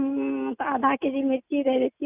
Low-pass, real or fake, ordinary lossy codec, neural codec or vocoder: 3.6 kHz; real; none; none